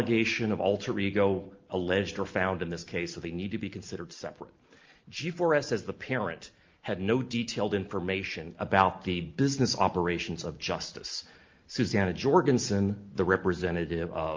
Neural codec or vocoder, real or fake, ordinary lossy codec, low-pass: none; real; Opus, 32 kbps; 7.2 kHz